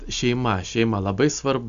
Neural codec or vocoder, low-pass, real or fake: none; 7.2 kHz; real